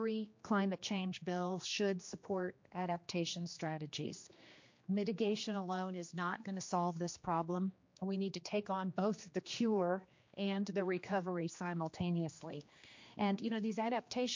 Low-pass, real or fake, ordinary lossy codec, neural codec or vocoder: 7.2 kHz; fake; MP3, 48 kbps; codec, 16 kHz, 2 kbps, X-Codec, HuBERT features, trained on general audio